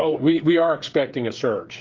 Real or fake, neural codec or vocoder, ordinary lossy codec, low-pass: fake; codec, 16 kHz, 8 kbps, FreqCodec, smaller model; Opus, 32 kbps; 7.2 kHz